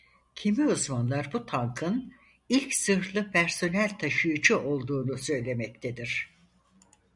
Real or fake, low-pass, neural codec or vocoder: real; 10.8 kHz; none